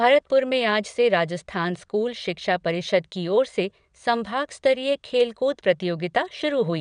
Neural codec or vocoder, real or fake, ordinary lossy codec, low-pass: vocoder, 22.05 kHz, 80 mel bands, WaveNeXt; fake; none; 9.9 kHz